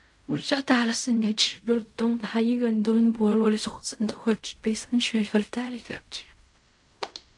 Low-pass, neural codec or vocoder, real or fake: 10.8 kHz; codec, 16 kHz in and 24 kHz out, 0.4 kbps, LongCat-Audio-Codec, fine tuned four codebook decoder; fake